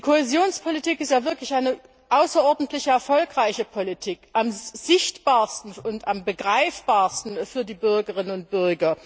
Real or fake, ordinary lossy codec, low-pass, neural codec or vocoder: real; none; none; none